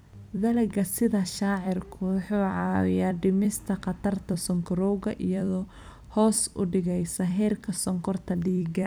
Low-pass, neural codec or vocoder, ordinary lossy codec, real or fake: none; vocoder, 44.1 kHz, 128 mel bands every 256 samples, BigVGAN v2; none; fake